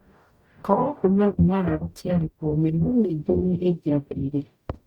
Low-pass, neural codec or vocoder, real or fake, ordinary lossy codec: 19.8 kHz; codec, 44.1 kHz, 0.9 kbps, DAC; fake; Opus, 64 kbps